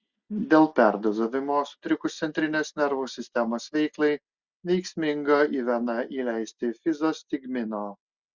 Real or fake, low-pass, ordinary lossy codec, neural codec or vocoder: real; 7.2 kHz; Opus, 64 kbps; none